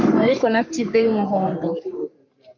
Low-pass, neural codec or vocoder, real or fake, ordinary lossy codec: 7.2 kHz; codec, 44.1 kHz, 3.4 kbps, Pupu-Codec; fake; MP3, 48 kbps